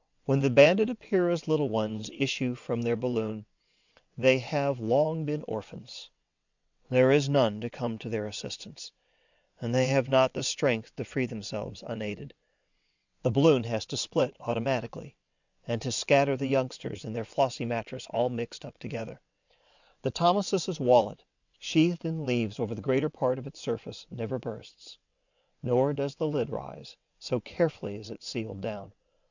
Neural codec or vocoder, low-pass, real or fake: vocoder, 22.05 kHz, 80 mel bands, WaveNeXt; 7.2 kHz; fake